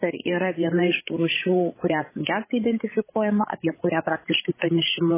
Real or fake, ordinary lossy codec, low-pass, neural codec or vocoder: fake; MP3, 16 kbps; 3.6 kHz; codec, 16 kHz, 16 kbps, FreqCodec, larger model